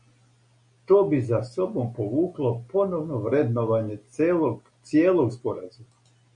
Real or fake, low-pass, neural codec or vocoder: real; 9.9 kHz; none